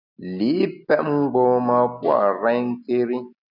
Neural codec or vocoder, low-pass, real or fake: none; 5.4 kHz; real